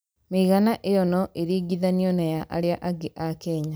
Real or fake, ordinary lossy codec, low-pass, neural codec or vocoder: real; none; none; none